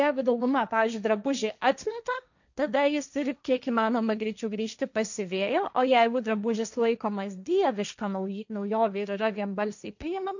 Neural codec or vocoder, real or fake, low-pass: codec, 16 kHz, 1.1 kbps, Voila-Tokenizer; fake; 7.2 kHz